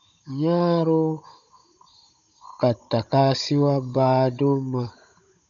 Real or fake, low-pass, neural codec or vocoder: fake; 7.2 kHz; codec, 16 kHz, 16 kbps, FunCodec, trained on Chinese and English, 50 frames a second